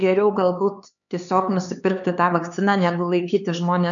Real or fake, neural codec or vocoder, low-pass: fake; codec, 16 kHz, 4 kbps, X-Codec, HuBERT features, trained on LibriSpeech; 7.2 kHz